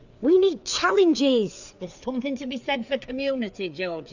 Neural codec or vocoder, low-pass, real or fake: codec, 16 kHz, 4 kbps, FreqCodec, larger model; 7.2 kHz; fake